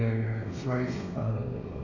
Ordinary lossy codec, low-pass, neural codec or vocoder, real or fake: none; 7.2 kHz; codec, 16 kHz, 2 kbps, X-Codec, WavLM features, trained on Multilingual LibriSpeech; fake